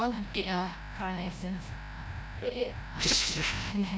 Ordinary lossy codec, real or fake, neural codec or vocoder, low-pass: none; fake; codec, 16 kHz, 0.5 kbps, FreqCodec, larger model; none